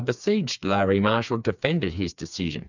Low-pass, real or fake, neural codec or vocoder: 7.2 kHz; fake; codec, 16 kHz, 4 kbps, FreqCodec, smaller model